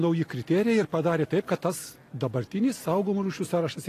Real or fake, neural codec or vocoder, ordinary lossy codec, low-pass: real; none; AAC, 48 kbps; 14.4 kHz